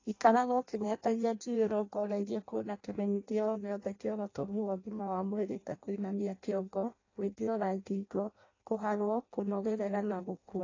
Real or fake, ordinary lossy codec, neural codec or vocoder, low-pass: fake; AAC, 32 kbps; codec, 16 kHz in and 24 kHz out, 0.6 kbps, FireRedTTS-2 codec; 7.2 kHz